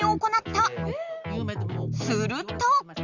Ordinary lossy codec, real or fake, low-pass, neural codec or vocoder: Opus, 64 kbps; real; 7.2 kHz; none